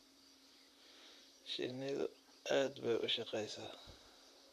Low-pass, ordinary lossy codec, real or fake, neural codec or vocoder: 14.4 kHz; none; fake; vocoder, 44.1 kHz, 128 mel bands, Pupu-Vocoder